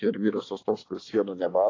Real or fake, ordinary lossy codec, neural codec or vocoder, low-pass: fake; AAC, 32 kbps; codec, 24 kHz, 1 kbps, SNAC; 7.2 kHz